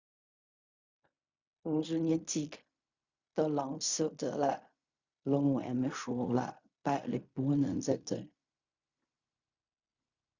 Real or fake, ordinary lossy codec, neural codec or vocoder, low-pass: fake; Opus, 64 kbps; codec, 16 kHz in and 24 kHz out, 0.4 kbps, LongCat-Audio-Codec, fine tuned four codebook decoder; 7.2 kHz